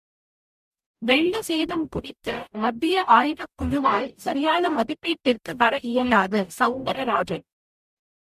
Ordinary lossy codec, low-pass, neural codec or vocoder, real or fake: MP3, 64 kbps; 14.4 kHz; codec, 44.1 kHz, 0.9 kbps, DAC; fake